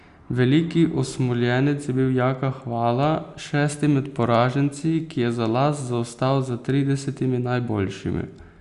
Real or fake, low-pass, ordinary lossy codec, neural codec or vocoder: real; 10.8 kHz; Opus, 64 kbps; none